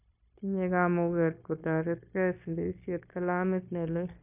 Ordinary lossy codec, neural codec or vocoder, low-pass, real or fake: none; codec, 16 kHz, 0.9 kbps, LongCat-Audio-Codec; 3.6 kHz; fake